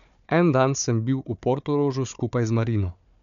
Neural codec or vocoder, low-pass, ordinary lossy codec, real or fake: codec, 16 kHz, 4 kbps, FunCodec, trained on Chinese and English, 50 frames a second; 7.2 kHz; MP3, 96 kbps; fake